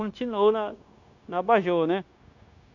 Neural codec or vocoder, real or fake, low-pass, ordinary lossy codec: codec, 16 kHz, 0.9 kbps, LongCat-Audio-Codec; fake; 7.2 kHz; none